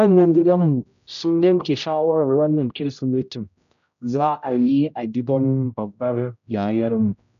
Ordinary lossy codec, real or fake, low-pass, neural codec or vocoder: none; fake; 7.2 kHz; codec, 16 kHz, 0.5 kbps, X-Codec, HuBERT features, trained on general audio